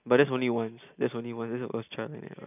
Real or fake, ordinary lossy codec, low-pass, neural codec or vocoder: real; none; 3.6 kHz; none